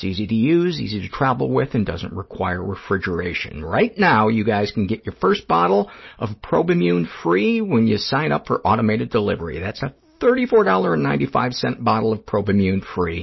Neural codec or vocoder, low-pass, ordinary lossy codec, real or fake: none; 7.2 kHz; MP3, 24 kbps; real